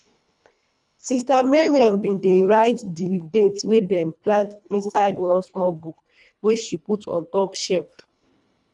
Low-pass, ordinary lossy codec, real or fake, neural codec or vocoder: 10.8 kHz; none; fake; codec, 24 kHz, 1.5 kbps, HILCodec